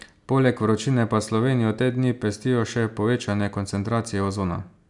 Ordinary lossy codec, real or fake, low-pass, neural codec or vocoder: none; real; 10.8 kHz; none